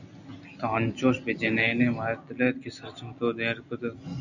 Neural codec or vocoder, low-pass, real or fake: none; 7.2 kHz; real